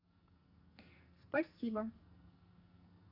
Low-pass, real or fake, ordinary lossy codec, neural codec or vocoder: 5.4 kHz; fake; none; codec, 44.1 kHz, 2.6 kbps, SNAC